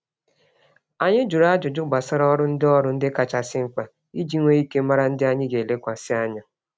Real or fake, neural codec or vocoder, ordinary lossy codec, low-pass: real; none; none; none